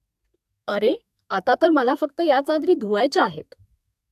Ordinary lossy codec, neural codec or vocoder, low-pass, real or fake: AAC, 96 kbps; codec, 32 kHz, 1.9 kbps, SNAC; 14.4 kHz; fake